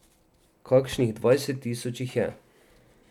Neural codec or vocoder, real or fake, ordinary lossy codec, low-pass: none; real; none; 19.8 kHz